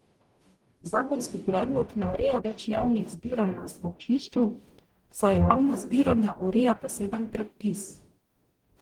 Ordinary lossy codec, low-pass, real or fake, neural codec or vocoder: Opus, 24 kbps; 19.8 kHz; fake; codec, 44.1 kHz, 0.9 kbps, DAC